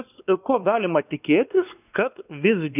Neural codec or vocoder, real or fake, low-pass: codec, 16 kHz, 2 kbps, X-Codec, WavLM features, trained on Multilingual LibriSpeech; fake; 3.6 kHz